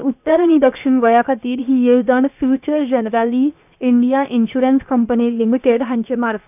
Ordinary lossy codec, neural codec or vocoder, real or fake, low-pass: none; codec, 16 kHz, 0.7 kbps, FocalCodec; fake; 3.6 kHz